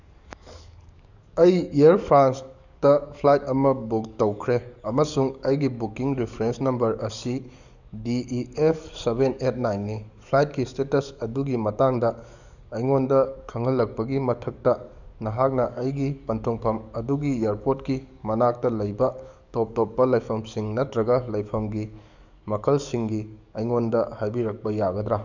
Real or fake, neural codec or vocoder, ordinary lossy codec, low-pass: fake; codec, 44.1 kHz, 7.8 kbps, DAC; none; 7.2 kHz